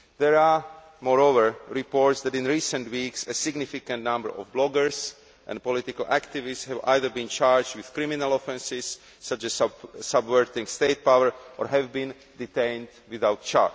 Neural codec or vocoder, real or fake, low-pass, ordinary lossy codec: none; real; none; none